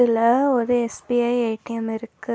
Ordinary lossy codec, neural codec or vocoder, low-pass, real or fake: none; none; none; real